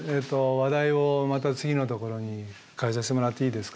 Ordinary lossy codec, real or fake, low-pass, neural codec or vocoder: none; real; none; none